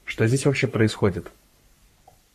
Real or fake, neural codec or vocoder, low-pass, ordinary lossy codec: fake; codec, 44.1 kHz, 3.4 kbps, Pupu-Codec; 14.4 kHz; MP3, 64 kbps